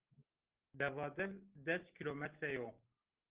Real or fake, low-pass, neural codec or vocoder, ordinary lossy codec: real; 3.6 kHz; none; Opus, 16 kbps